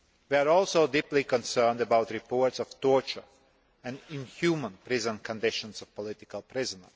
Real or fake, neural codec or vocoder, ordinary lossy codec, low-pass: real; none; none; none